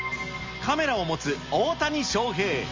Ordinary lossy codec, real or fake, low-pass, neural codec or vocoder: Opus, 32 kbps; real; 7.2 kHz; none